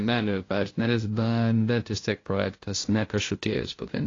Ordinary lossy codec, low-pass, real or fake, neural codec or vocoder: AAC, 32 kbps; 7.2 kHz; fake; codec, 16 kHz, 0.5 kbps, FunCodec, trained on LibriTTS, 25 frames a second